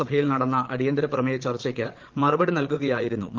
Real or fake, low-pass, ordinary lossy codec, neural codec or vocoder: fake; 7.2 kHz; Opus, 16 kbps; vocoder, 22.05 kHz, 80 mel bands, Vocos